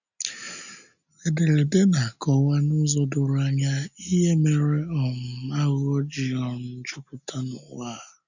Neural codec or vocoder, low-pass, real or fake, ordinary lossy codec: none; 7.2 kHz; real; none